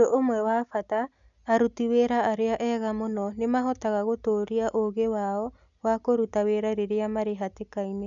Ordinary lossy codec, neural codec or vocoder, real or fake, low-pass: none; none; real; 7.2 kHz